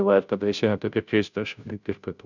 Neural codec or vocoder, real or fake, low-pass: codec, 16 kHz, 0.5 kbps, FunCodec, trained on Chinese and English, 25 frames a second; fake; 7.2 kHz